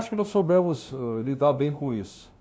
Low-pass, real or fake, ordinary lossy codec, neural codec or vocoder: none; fake; none; codec, 16 kHz, 0.5 kbps, FunCodec, trained on LibriTTS, 25 frames a second